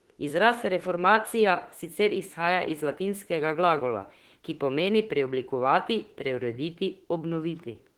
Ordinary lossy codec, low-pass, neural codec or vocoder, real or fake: Opus, 16 kbps; 19.8 kHz; autoencoder, 48 kHz, 32 numbers a frame, DAC-VAE, trained on Japanese speech; fake